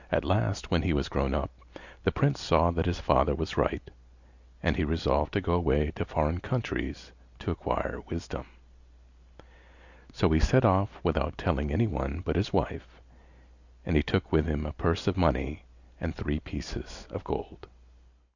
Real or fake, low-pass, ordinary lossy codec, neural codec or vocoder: real; 7.2 kHz; Opus, 64 kbps; none